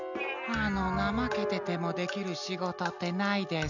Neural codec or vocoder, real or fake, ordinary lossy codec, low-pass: none; real; none; 7.2 kHz